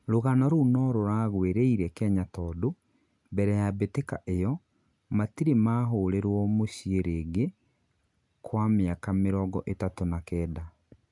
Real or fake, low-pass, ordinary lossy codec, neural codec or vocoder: real; 10.8 kHz; none; none